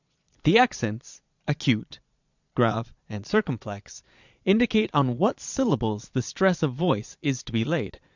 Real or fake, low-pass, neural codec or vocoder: fake; 7.2 kHz; vocoder, 22.05 kHz, 80 mel bands, Vocos